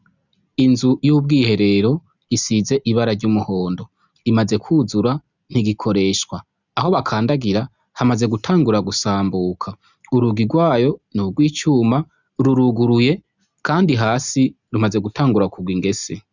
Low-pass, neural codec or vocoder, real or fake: 7.2 kHz; none; real